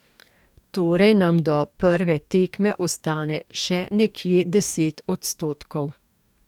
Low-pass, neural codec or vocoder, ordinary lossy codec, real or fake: 19.8 kHz; codec, 44.1 kHz, 2.6 kbps, DAC; none; fake